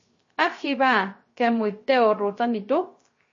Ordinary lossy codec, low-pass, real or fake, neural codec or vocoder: MP3, 32 kbps; 7.2 kHz; fake; codec, 16 kHz, 0.3 kbps, FocalCodec